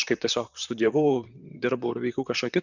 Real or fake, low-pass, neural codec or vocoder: fake; 7.2 kHz; vocoder, 44.1 kHz, 128 mel bands, Pupu-Vocoder